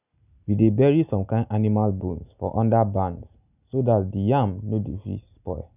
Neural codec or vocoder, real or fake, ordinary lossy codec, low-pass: none; real; none; 3.6 kHz